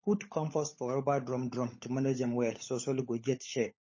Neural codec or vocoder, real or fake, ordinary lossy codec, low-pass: codec, 16 kHz, 16 kbps, FunCodec, trained on LibriTTS, 50 frames a second; fake; MP3, 32 kbps; 7.2 kHz